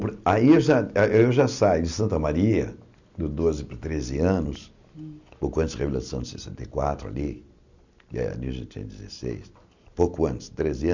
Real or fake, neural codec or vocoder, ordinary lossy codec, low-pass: real; none; none; 7.2 kHz